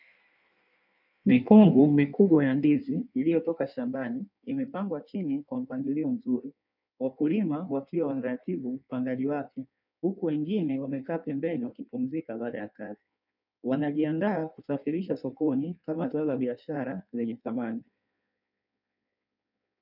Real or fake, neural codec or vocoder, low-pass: fake; codec, 16 kHz in and 24 kHz out, 1.1 kbps, FireRedTTS-2 codec; 5.4 kHz